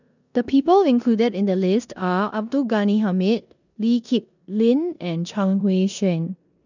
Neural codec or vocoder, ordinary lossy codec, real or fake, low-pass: codec, 16 kHz in and 24 kHz out, 0.9 kbps, LongCat-Audio-Codec, four codebook decoder; none; fake; 7.2 kHz